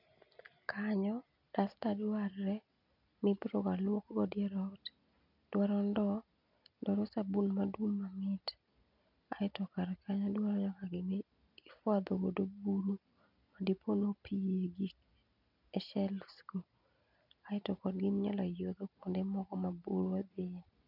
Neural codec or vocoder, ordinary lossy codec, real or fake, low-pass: none; none; real; 5.4 kHz